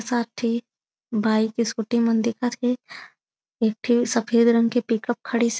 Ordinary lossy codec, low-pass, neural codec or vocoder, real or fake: none; none; none; real